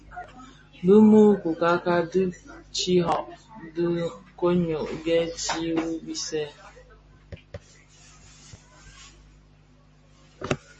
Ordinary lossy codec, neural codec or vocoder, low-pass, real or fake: MP3, 32 kbps; none; 10.8 kHz; real